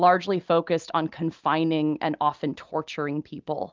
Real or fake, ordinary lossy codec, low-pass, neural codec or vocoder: real; Opus, 24 kbps; 7.2 kHz; none